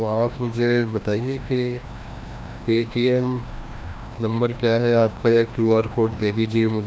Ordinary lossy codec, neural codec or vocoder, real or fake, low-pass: none; codec, 16 kHz, 1 kbps, FreqCodec, larger model; fake; none